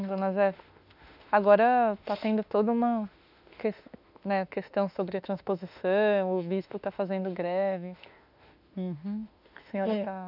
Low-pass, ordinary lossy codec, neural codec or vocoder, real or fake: 5.4 kHz; none; autoencoder, 48 kHz, 32 numbers a frame, DAC-VAE, trained on Japanese speech; fake